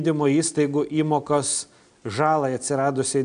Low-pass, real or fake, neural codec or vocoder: 9.9 kHz; real; none